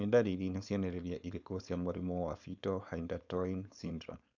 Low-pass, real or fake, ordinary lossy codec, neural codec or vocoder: 7.2 kHz; fake; none; codec, 16 kHz, 4.8 kbps, FACodec